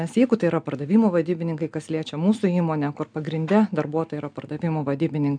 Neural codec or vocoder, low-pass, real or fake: none; 9.9 kHz; real